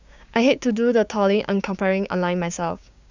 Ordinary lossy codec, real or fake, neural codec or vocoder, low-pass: none; fake; codec, 16 kHz, 6 kbps, DAC; 7.2 kHz